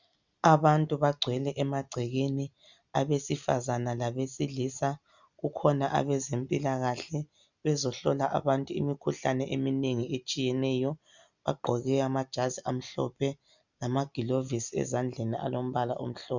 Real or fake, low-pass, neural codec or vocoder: real; 7.2 kHz; none